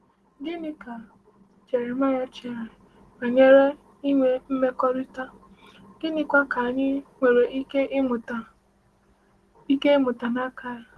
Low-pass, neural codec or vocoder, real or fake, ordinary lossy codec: 10.8 kHz; none; real; Opus, 16 kbps